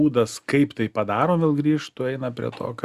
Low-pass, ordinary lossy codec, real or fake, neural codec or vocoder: 14.4 kHz; Opus, 64 kbps; real; none